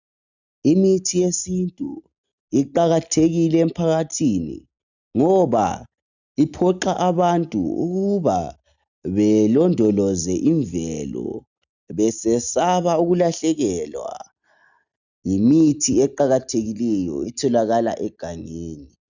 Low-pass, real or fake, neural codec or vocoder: 7.2 kHz; real; none